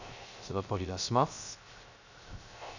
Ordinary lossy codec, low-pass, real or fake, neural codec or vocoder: none; 7.2 kHz; fake; codec, 16 kHz, 0.3 kbps, FocalCodec